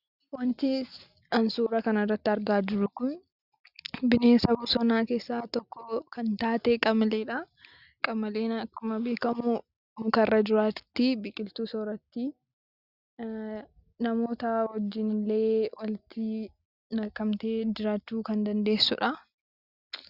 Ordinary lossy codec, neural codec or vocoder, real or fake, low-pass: Opus, 64 kbps; none; real; 5.4 kHz